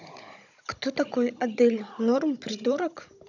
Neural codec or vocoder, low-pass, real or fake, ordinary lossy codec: codec, 16 kHz, 16 kbps, FunCodec, trained on Chinese and English, 50 frames a second; 7.2 kHz; fake; none